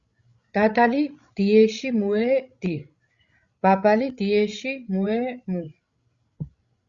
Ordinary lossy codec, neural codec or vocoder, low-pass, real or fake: Opus, 64 kbps; codec, 16 kHz, 16 kbps, FreqCodec, larger model; 7.2 kHz; fake